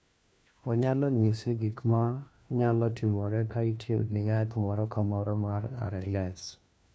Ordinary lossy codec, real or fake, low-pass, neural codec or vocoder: none; fake; none; codec, 16 kHz, 1 kbps, FunCodec, trained on LibriTTS, 50 frames a second